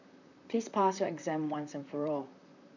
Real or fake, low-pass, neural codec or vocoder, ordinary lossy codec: fake; 7.2 kHz; vocoder, 44.1 kHz, 128 mel bands, Pupu-Vocoder; none